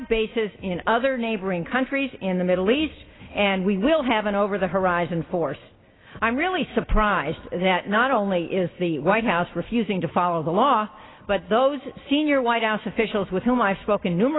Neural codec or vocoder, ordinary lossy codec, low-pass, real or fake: none; AAC, 16 kbps; 7.2 kHz; real